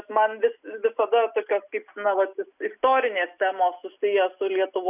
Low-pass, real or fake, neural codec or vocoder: 3.6 kHz; real; none